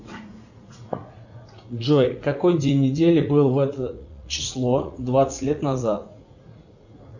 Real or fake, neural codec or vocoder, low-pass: fake; vocoder, 44.1 kHz, 80 mel bands, Vocos; 7.2 kHz